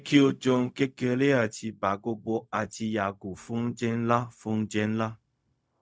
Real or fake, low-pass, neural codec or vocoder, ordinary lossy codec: fake; none; codec, 16 kHz, 0.4 kbps, LongCat-Audio-Codec; none